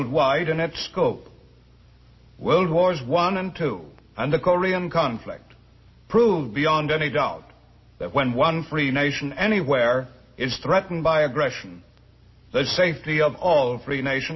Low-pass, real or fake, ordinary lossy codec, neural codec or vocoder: 7.2 kHz; real; MP3, 24 kbps; none